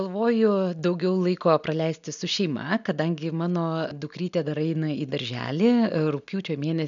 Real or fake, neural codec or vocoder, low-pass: real; none; 7.2 kHz